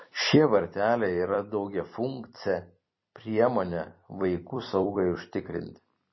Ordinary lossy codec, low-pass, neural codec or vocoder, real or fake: MP3, 24 kbps; 7.2 kHz; vocoder, 44.1 kHz, 128 mel bands every 256 samples, BigVGAN v2; fake